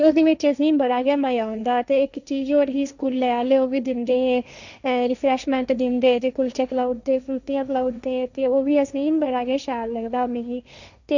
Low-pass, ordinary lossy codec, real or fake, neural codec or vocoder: 7.2 kHz; none; fake; codec, 16 kHz, 1.1 kbps, Voila-Tokenizer